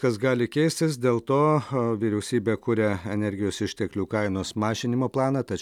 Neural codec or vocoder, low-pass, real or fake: none; 19.8 kHz; real